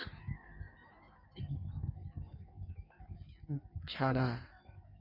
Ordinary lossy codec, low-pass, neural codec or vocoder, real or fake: none; 5.4 kHz; codec, 16 kHz in and 24 kHz out, 1.1 kbps, FireRedTTS-2 codec; fake